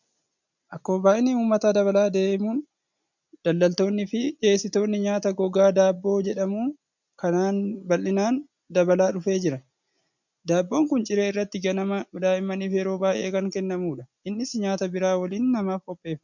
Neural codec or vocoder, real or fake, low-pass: none; real; 7.2 kHz